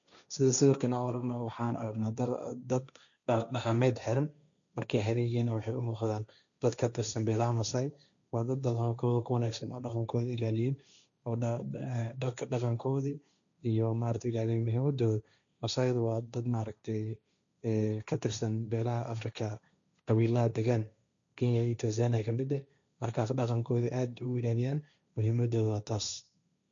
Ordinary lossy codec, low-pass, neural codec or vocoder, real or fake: none; 7.2 kHz; codec, 16 kHz, 1.1 kbps, Voila-Tokenizer; fake